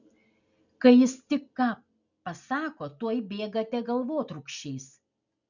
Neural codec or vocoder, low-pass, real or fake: none; 7.2 kHz; real